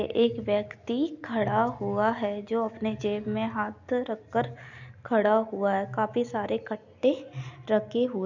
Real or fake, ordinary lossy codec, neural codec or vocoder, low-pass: real; none; none; 7.2 kHz